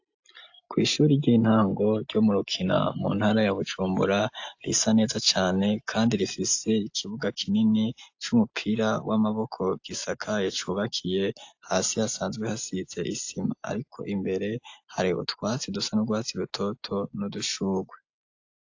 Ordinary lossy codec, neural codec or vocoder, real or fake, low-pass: AAC, 48 kbps; none; real; 7.2 kHz